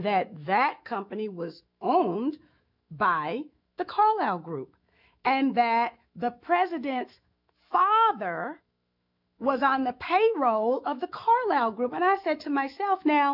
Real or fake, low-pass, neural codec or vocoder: fake; 5.4 kHz; autoencoder, 48 kHz, 128 numbers a frame, DAC-VAE, trained on Japanese speech